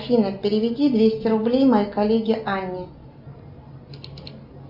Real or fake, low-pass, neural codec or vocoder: real; 5.4 kHz; none